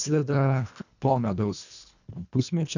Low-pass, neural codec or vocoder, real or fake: 7.2 kHz; codec, 24 kHz, 1.5 kbps, HILCodec; fake